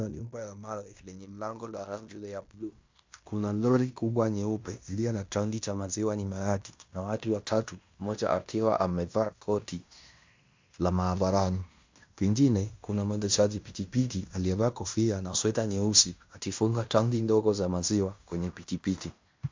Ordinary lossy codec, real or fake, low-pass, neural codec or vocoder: MP3, 64 kbps; fake; 7.2 kHz; codec, 16 kHz in and 24 kHz out, 0.9 kbps, LongCat-Audio-Codec, fine tuned four codebook decoder